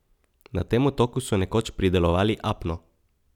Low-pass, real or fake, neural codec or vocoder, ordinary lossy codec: 19.8 kHz; real; none; none